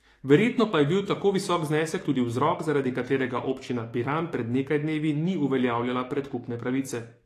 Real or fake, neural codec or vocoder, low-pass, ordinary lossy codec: fake; codec, 44.1 kHz, 7.8 kbps, DAC; 14.4 kHz; AAC, 48 kbps